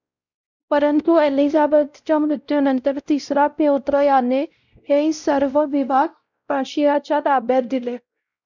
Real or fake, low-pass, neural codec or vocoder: fake; 7.2 kHz; codec, 16 kHz, 0.5 kbps, X-Codec, WavLM features, trained on Multilingual LibriSpeech